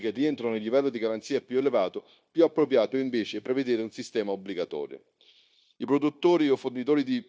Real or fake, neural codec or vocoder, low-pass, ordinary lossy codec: fake; codec, 16 kHz, 0.9 kbps, LongCat-Audio-Codec; none; none